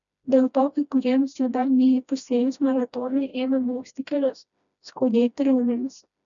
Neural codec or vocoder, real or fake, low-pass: codec, 16 kHz, 1 kbps, FreqCodec, smaller model; fake; 7.2 kHz